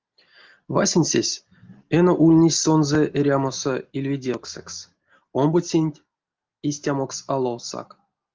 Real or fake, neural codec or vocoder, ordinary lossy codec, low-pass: real; none; Opus, 24 kbps; 7.2 kHz